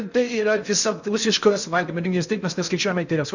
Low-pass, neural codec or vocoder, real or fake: 7.2 kHz; codec, 16 kHz in and 24 kHz out, 0.6 kbps, FocalCodec, streaming, 4096 codes; fake